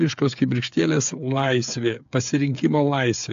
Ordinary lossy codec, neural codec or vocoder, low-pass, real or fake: AAC, 64 kbps; codec, 16 kHz, 8 kbps, FreqCodec, smaller model; 7.2 kHz; fake